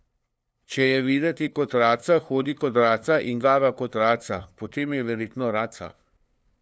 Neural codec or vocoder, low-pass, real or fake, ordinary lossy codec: codec, 16 kHz, 2 kbps, FunCodec, trained on LibriTTS, 25 frames a second; none; fake; none